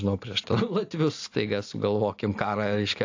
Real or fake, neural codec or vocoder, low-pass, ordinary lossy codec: real; none; 7.2 kHz; AAC, 48 kbps